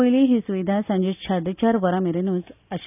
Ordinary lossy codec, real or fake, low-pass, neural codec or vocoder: none; real; 3.6 kHz; none